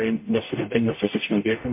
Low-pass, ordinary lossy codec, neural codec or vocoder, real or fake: 3.6 kHz; MP3, 24 kbps; codec, 44.1 kHz, 0.9 kbps, DAC; fake